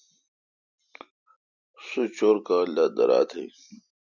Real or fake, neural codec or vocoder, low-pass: real; none; 7.2 kHz